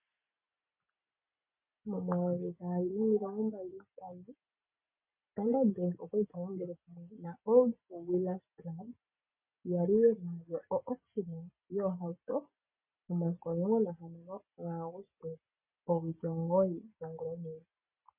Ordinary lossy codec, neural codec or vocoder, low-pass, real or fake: MP3, 32 kbps; none; 3.6 kHz; real